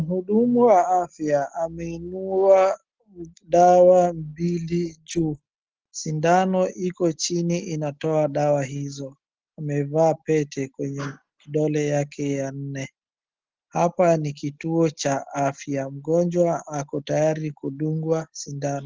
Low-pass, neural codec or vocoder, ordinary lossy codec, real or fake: 7.2 kHz; none; Opus, 16 kbps; real